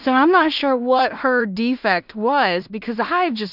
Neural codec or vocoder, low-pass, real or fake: codec, 16 kHz in and 24 kHz out, 0.4 kbps, LongCat-Audio-Codec, two codebook decoder; 5.4 kHz; fake